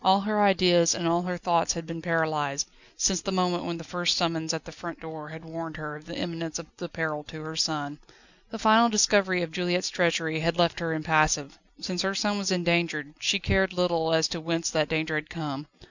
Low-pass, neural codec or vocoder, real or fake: 7.2 kHz; none; real